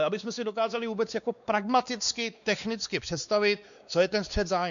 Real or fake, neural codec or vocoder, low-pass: fake; codec, 16 kHz, 2 kbps, X-Codec, WavLM features, trained on Multilingual LibriSpeech; 7.2 kHz